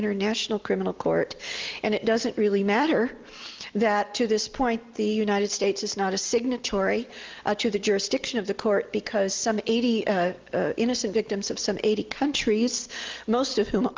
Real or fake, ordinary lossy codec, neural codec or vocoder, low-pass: real; Opus, 32 kbps; none; 7.2 kHz